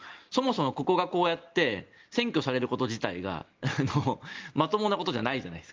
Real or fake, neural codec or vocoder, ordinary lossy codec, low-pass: real; none; Opus, 32 kbps; 7.2 kHz